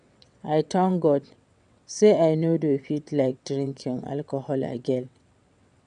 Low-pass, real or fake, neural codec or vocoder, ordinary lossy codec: 9.9 kHz; fake; vocoder, 22.05 kHz, 80 mel bands, WaveNeXt; none